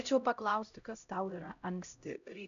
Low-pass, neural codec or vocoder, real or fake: 7.2 kHz; codec, 16 kHz, 0.5 kbps, X-Codec, HuBERT features, trained on LibriSpeech; fake